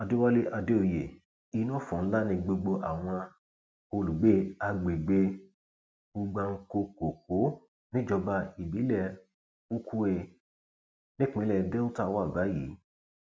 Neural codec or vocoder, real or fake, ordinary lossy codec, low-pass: none; real; none; none